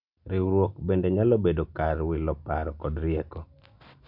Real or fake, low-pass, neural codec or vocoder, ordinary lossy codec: real; 5.4 kHz; none; none